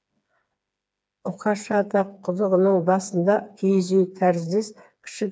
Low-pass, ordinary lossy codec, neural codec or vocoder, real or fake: none; none; codec, 16 kHz, 4 kbps, FreqCodec, smaller model; fake